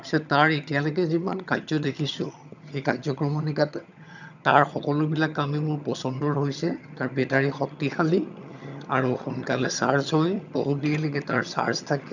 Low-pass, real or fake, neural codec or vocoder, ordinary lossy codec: 7.2 kHz; fake; vocoder, 22.05 kHz, 80 mel bands, HiFi-GAN; none